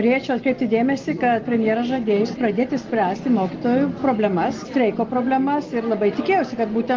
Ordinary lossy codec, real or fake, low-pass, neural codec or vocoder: Opus, 32 kbps; real; 7.2 kHz; none